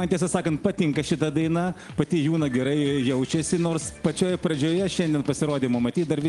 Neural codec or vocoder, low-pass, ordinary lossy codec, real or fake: none; 10.8 kHz; Opus, 24 kbps; real